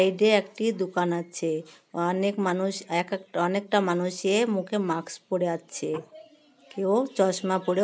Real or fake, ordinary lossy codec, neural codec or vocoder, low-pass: real; none; none; none